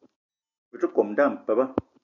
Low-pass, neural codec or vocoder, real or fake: 7.2 kHz; none; real